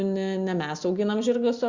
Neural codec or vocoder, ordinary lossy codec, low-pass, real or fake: none; Opus, 64 kbps; 7.2 kHz; real